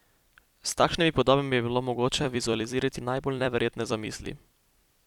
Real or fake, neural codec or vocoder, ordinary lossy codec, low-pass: fake; vocoder, 44.1 kHz, 128 mel bands every 256 samples, BigVGAN v2; none; 19.8 kHz